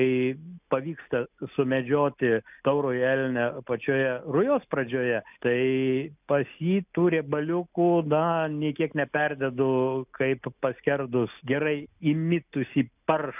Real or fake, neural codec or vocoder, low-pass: real; none; 3.6 kHz